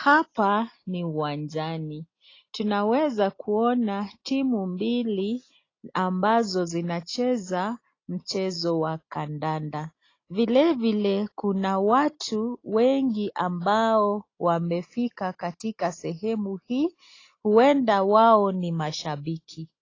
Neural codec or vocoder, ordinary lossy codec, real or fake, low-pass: none; AAC, 32 kbps; real; 7.2 kHz